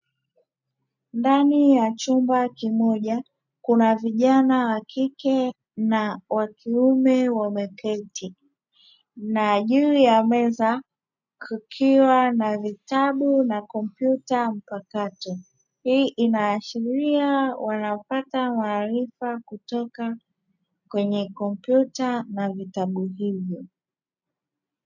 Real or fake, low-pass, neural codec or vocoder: real; 7.2 kHz; none